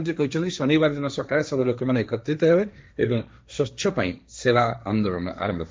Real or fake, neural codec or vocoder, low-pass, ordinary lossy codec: fake; codec, 16 kHz, 1.1 kbps, Voila-Tokenizer; none; none